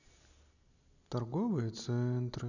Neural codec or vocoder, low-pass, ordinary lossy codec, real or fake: none; 7.2 kHz; none; real